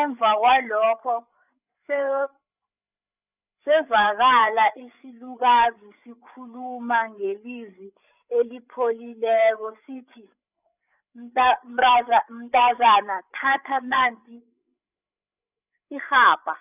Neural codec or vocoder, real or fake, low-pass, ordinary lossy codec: codec, 16 kHz, 8 kbps, FreqCodec, larger model; fake; 3.6 kHz; none